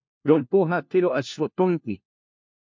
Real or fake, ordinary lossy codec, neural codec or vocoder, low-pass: fake; MP3, 48 kbps; codec, 16 kHz, 1 kbps, FunCodec, trained on LibriTTS, 50 frames a second; 7.2 kHz